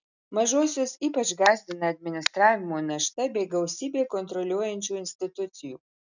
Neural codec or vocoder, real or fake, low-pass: none; real; 7.2 kHz